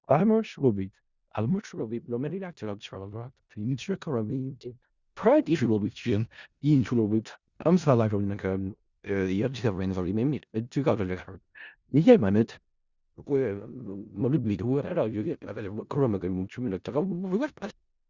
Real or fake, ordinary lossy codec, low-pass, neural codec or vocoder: fake; Opus, 64 kbps; 7.2 kHz; codec, 16 kHz in and 24 kHz out, 0.4 kbps, LongCat-Audio-Codec, four codebook decoder